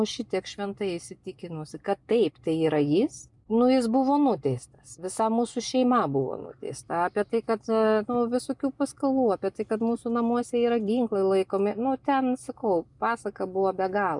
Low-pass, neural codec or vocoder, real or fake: 10.8 kHz; none; real